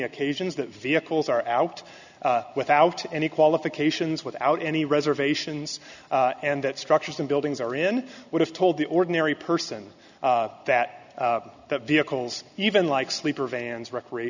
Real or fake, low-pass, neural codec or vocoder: real; 7.2 kHz; none